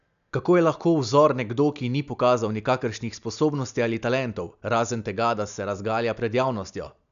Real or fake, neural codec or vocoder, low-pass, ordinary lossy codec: real; none; 7.2 kHz; none